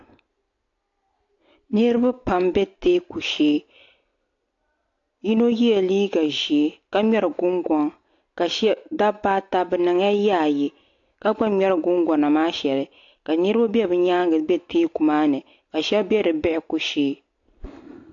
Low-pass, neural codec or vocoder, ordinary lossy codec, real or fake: 7.2 kHz; none; AAC, 48 kbps; real